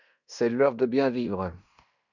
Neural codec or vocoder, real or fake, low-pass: codec, 16 kHz in and 24 kHz out, 0.9 kbps, LongCat-Audio-Codec, fine tuned four codebook decoder; fake; 7.2 kHz